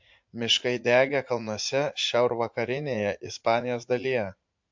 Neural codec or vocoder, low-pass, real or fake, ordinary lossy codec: vocoder, 44.1 kHz, 80 mel bands, Vocos; 7.2 kHz; fake; MP3, 48 kbps